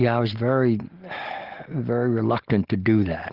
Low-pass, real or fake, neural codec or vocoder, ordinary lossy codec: 5.4 kHz; real; none; Opus, 16 kbps